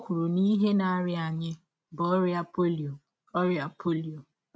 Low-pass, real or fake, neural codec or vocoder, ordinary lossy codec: none; real; none; none